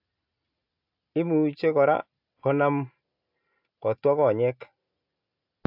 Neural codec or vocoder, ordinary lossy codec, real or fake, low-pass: none; AAC, 48 kbps; real; 5.4 kHz